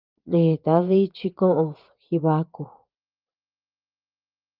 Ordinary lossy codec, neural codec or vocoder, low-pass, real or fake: Opus, 24 kbps; vocoder, 24 kHz, 100 mel bands, Vocos; 5.4 kHz; fake